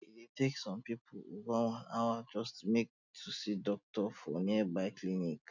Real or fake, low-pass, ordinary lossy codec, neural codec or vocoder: real; 7.2 kHz; none; none